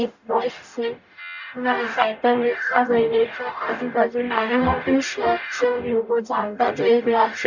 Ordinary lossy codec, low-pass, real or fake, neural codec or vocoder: none; 7.2 kHz; fake; codec, 44.1 kHz, 0.9 kbps, DAC